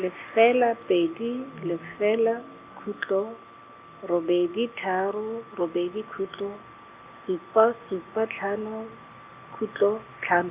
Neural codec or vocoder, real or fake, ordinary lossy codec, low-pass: none; real; Opus, 64 kbps; 3.6 kHz